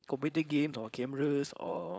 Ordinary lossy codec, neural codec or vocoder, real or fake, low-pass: none; codec, 16 kHz, 4.8 kbps, FACodec; fake; none